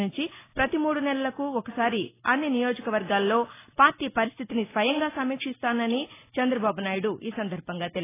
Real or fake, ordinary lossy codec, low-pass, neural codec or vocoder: real; AAC, 16 kbps; 3.6 kHz; none